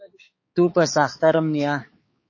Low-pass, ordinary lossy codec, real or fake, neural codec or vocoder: 7.2 kHz; MP3, 32 kbps; fake; codec, 16 kHz, 4 kbps, X-Codec, HuBERT features, trained on balanced general audio